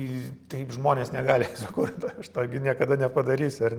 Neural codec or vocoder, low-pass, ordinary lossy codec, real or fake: none; 19.8 kHz; Opus, 24 kbps; real